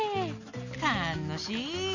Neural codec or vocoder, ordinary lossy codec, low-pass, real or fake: none; none; 7.2 kHz; real